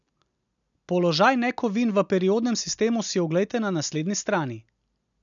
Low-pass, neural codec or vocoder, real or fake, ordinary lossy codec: 7.2 kHz; none; real; none